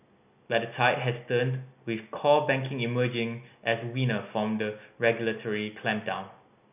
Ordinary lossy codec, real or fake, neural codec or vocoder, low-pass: none; real; none; 3.6 kHz